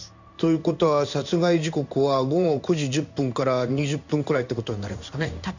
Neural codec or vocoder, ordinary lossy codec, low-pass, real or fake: codec, 16 kHz in and 24 kHz out, 1 kbps, XY-Tokenizer; none; 7.2 kHz; fake